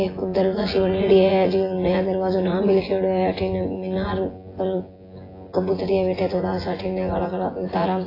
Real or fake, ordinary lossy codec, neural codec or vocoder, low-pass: fake; AAC, 24 kbps; vocoder, 24 kHz, 100 mel bands, Vocos; 5.4 kHz